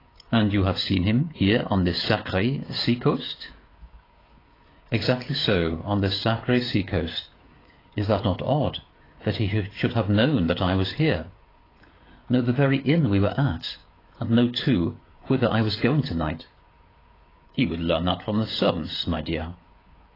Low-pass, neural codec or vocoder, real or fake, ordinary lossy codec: 5.4 kHz; codec, 16 kHz, 16 kbps, FreqCodec, smaller model; fake; AAC, 24 kbps